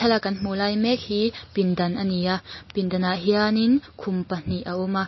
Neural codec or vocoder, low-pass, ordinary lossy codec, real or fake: none; 7.2 kHz; MP3, 24 kbps; real